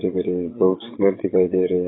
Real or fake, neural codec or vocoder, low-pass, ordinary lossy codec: fake; vocoder, 22.05 kHz, 80 mel bands, WaveNeXt; 7.2 kHz; AAC, 16 kbps